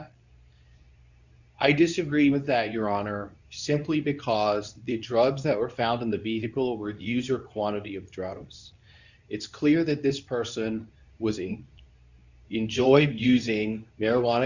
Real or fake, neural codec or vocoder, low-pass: fake; codec, 24 kHz, 0.9 kbps, WavTokenizer, medium speech release version 2; 7.2 kHz